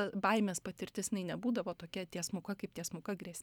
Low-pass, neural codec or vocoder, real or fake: 19.8 kHz; none; real